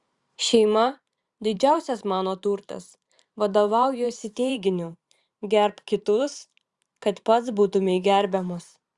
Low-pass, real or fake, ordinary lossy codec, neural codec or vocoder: 10.8 kHz; fake; Opus, 64 kbps; vocoder, 44.1 kHz, 128 mel bands every 512 samples, BigVGAN v2